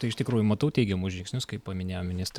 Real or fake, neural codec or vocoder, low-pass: real; none; 19.8 kHz